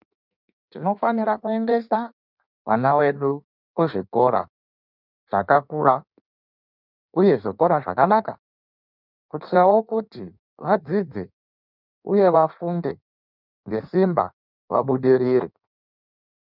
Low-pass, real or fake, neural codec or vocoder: 5.4 kHz; fake; codec, 16 kHz in and 24 kHz out, 1.1 kbps, FireRedTTS-2 codec